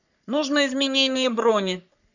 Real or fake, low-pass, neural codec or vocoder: fake; 7.2 kHz; codec, 44.1 kHz, 3.4 kbps, Pupu-Codec